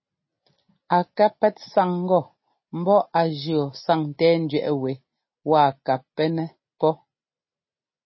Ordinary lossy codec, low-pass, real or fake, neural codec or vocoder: MP3, 24 kbps; 7.2 kHz; real; none